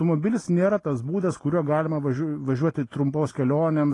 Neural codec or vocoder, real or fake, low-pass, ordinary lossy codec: none; real; 10.8 kHz; AAC, 32 kbps